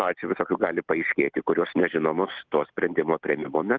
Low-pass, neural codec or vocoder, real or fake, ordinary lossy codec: 7.2 kHz; none; real; Opus, 24 kbps